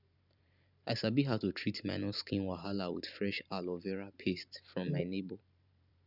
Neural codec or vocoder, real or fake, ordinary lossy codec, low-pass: vocoder, 44.1 kHz, 128 mel bands every 512 samples, BigVGAN v2; fake; none; 5.4 kHz